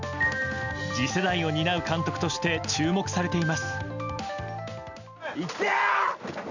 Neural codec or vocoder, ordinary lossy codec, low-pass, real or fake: none; none; 7.2 kHz; real